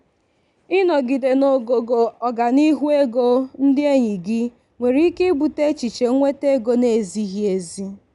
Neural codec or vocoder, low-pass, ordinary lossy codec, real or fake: none; 10.8 kHz; none; real